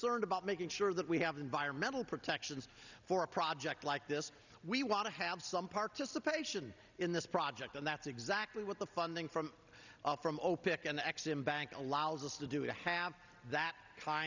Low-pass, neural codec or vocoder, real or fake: 7.2 kHz; none; real